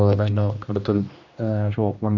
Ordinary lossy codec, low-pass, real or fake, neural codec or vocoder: none; 7.2 kHz; fake; codec, 16 kHz, 1 kbps, X-Codec, HuBERT features, trained on general audio